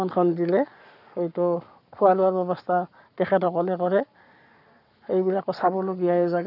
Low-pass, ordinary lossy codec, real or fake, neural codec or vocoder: 5.4 kHz; none; fake; codec, 44.1 kHz, 7.8 kbps, Pupu-Codec